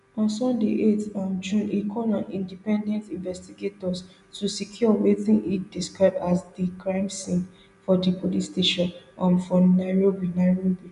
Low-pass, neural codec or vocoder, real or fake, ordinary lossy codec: 10.8 kHz; none; real; none